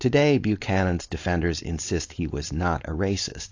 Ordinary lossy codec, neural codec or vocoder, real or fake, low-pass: AAC, 48 kbps; codec, 16 kHz, 4.8 kbps, FACodec; fake; 7.2 kHz